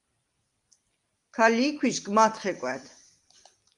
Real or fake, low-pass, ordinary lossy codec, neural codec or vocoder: real; 10.8 kHz; Opus, 32 kbps; none